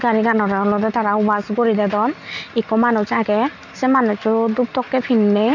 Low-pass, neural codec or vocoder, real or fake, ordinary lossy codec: 7.2 kHz; none; real; none